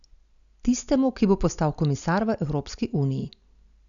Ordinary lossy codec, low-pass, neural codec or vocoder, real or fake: none; 7.2 kHz; none; real